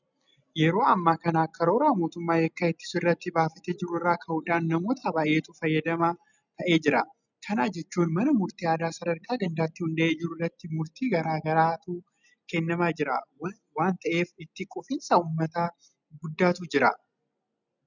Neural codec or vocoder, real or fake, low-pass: none; real; 7.2 kHz